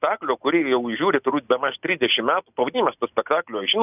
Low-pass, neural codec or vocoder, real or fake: 3.6 kHz; none; real